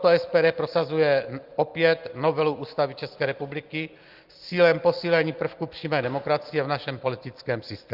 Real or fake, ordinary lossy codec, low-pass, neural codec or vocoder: real; Opus, 32 kbps; 5.4 kHz; none